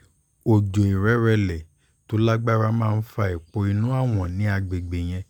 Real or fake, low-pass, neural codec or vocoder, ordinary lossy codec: real; 19.8 kHz; none; none